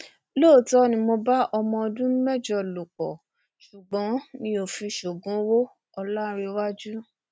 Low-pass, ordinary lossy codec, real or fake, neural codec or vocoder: none; none; real; none